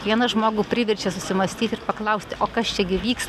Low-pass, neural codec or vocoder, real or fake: 14.4 kHz; none; real